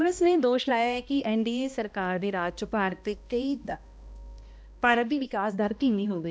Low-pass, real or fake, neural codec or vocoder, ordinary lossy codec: none; fake; codec, 16 kHz, 1 kbps, X-Codec, HuBERT features, trained on balanced general audio; none